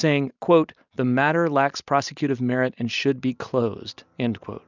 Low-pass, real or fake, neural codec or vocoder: 7.2 kHz; real; none